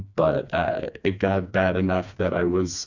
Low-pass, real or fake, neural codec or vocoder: 7.2 kHz; fake; codec, 16 kHz, 2 kbps, FreqCodec, smaller model